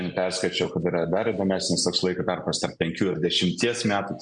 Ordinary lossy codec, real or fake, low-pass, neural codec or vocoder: MP3, 64 kbps; real; 9.9 kHz; none